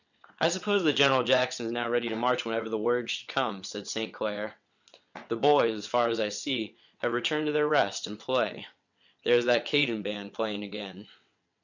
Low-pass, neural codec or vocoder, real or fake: 7.2 kHz; vocoder, 22.05 kHz, 80 mel bands, WaveNeXt; fake